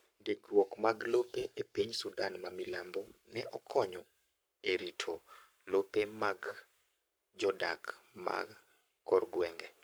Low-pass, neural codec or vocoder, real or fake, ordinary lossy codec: none; codec, 44.1 kHz, 7.8 kbps, Pupu-Codec; fake; none